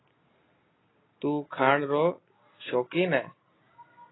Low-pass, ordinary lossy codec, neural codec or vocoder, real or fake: 7.2 kHz; AAC, 16 kbps; none; real